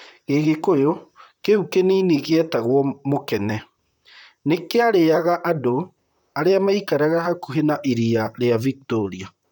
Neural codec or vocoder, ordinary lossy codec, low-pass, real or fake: vocoder, 44.1 kHz, 128 mel bands, Pupu-Vocoder; none; 19.8 kHz; fake